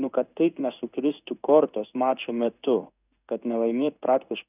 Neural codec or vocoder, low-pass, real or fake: codec, 16 kHz in and 24 kHz out, 1 kbps, XY-Tokenizer; 3.6 kHz; fake